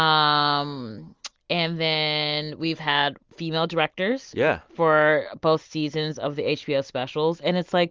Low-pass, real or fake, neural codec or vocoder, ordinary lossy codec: 7.2 kHz; real; none; Opus, 32 kbps